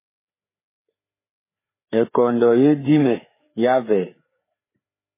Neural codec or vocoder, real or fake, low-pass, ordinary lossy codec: codec, 16 kHz, 4 kbps, FreqCodec, larger model; fake; 3.6 kHz; MP3, 16 kbps